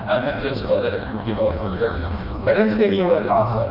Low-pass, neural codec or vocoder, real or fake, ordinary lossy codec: 5.4 kHz; codec, 16 kHz, 1 kbps, FreqCodec, smaller model; fake; none